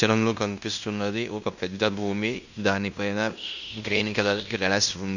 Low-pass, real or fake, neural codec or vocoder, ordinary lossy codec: 7.2 kHz; fake; codec, 16 kHz in and 24 kHz out, 0.9 kbps, LongCat-Audio-Codec, fine tuned four codebook decoder; none